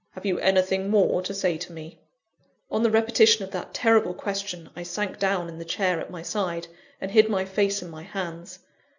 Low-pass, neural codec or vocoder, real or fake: 7.2 kHz; none; real